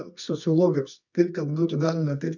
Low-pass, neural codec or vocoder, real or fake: 7.2 kHz; codec, 24 kHz, 0.9 kbps, WavTokenizer, medium music audio release; fake